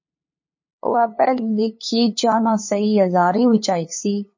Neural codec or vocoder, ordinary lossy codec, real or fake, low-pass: codec, 16 kHz, 2 kbps, FunCodec, trained on LibriTTS, 25 frames a second; MP3, 32 kbps; fake; 7.2 kHz